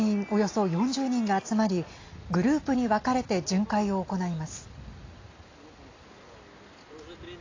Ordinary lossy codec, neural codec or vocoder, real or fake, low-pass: AAC, 32 kbps; none; real; 7.2 kHz